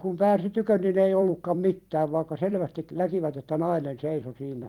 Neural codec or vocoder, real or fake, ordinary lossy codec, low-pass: vocoder, 44.1 kHz, 128 mel bands every 256 samples, BigVGAN v2; fake; Opus, 32 kbps; 19.8 kHz